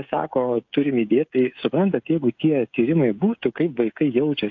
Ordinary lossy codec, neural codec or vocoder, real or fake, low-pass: AAC, 48 kbps; codec, 16 kHz, 16 kbps, FreqCodec, smaller model; fake; 7.2 kHz